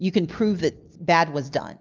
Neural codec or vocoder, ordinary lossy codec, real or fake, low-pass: none; Opus, 24 kbps; real; 7.2 kHz